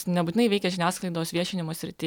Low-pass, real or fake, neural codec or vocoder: 19.8 kHz; real; none